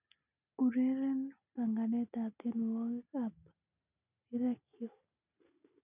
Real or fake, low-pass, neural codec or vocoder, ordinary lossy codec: real; 3.6 kHz; none; none